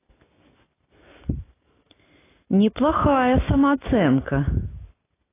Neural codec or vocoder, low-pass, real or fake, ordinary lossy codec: none; 3.6 kHz; real; AAC, 16 kbps